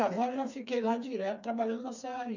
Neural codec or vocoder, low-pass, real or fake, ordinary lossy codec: codec, 16 kHz, 4 kbps, FreqCodec, smaller model; 7.2 kHz; fake; none